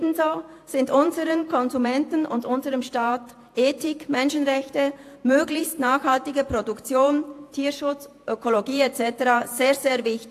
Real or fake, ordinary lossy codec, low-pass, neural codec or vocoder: fake; AAC, 64 kbps; 14.4 kHz; vocoder, 48 kHz, 128 mel bands, Vocos